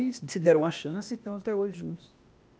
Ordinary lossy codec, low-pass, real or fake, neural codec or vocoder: none; none; fake; codec, 16 kHz, 0.8 kbps, ZipCodec